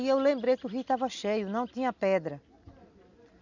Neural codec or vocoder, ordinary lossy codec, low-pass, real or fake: none; none; 7.2 kHz; real